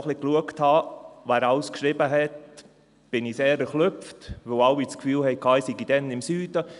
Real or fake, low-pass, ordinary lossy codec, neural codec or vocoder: real; 10.8 kHz; none; none